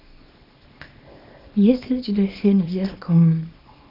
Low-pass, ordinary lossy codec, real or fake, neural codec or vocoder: 5.4 kHz; AAC, 48 kbps; fake; codec, 24 kHz, 0.9 kbps, WavTokenizer, small release